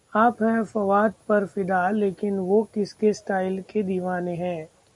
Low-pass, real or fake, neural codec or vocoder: 10.8 kHz; real; none